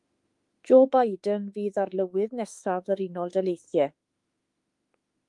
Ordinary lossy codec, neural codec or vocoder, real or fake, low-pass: Opus, 32 kbps; autoencoder, 48 kHz, 32 numbers a frame, DAC-VAE, trained on Japanese speech; fake; 10.8 kHz